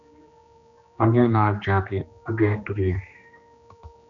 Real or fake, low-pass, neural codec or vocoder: fake; 7.2 kHz; codec, 16 kHz, 2 kbps, X-Codec, HuBERT features, trained on general audio